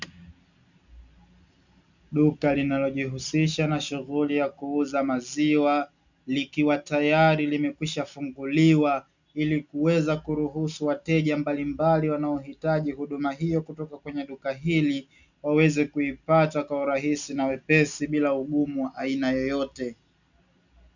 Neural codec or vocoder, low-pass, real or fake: none; 7.2 kHz; real